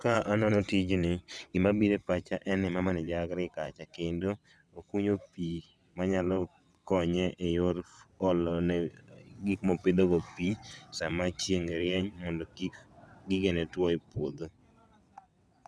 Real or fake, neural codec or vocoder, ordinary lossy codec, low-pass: fake; vocoder, 22.05 kHz, 80 mel bands, WaveNeXt; none; none